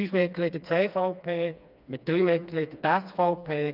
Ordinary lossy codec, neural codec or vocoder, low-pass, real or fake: none; codec, 16 kHz, 2 kbps, FreqCodec, smaller model; 5.4 kHz; fake